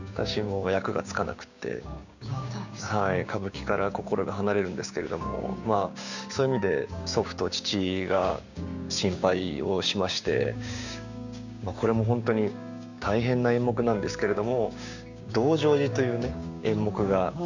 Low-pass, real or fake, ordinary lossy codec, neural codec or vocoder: 7.2 kHz; fake; none; codec, 16 kHz, 6 kbps, DAC